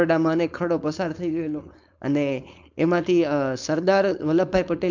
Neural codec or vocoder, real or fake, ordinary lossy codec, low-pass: codec, 16 kHz, 4.8 kbps, FACodec; fake; MP3, 64 kbps; 7.2 kHz